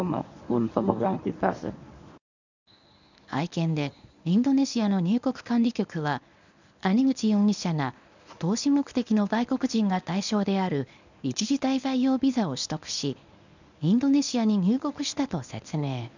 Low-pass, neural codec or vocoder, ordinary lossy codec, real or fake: 7.2 kHz; codec, 24 kHz, 0.9 kbps, WavTokenizer, medium speech release version 1; none; fake